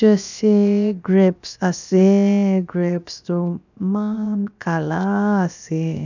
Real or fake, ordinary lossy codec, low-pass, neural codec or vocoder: fake; none; 7.2 kHz; codec, 16 kHz, 0.7 kbps, FocalCodec